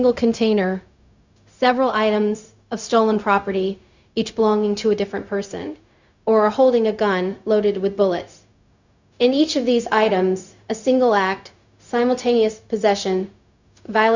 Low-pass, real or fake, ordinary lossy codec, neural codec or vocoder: 7.2 kHz; fake; Opus, 64 kbps; codec, 16 kHz, 0.4 kbps, LongCat-Audio-Codec